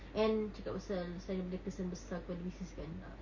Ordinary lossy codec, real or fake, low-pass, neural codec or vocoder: none; real; 7.2 kHz; none